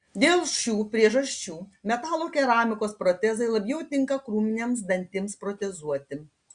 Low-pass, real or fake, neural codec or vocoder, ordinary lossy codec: 9.9 kHz; real; none; Opus, 64 kbps